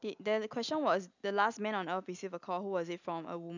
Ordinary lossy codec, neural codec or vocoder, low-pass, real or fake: none; none; 7.2 kHz; real